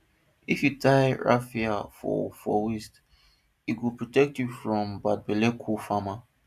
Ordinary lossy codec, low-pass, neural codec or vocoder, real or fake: MP3, 96 kbps; 14.4 kHz; none; real